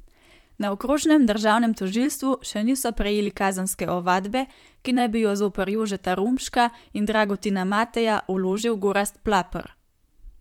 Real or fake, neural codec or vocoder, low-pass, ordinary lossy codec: fake; vocoder, 44.1 kHz, 128 mel bands, Pupu-Vocoder; 19.8 kHz; MP3, 96 kbps